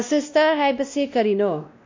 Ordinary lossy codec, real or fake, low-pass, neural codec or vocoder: MP3, 48 kbps; fake; 7.2 kHz; codec, 16 kHz, 1 kbps, X-Codec, WavLM features, trained on Multilingual LibriSpeech